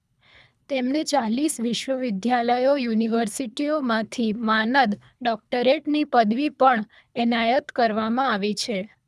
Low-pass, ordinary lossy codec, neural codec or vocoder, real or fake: none; none; codec, 24 kHz, 3 kbps, HILCodec; fake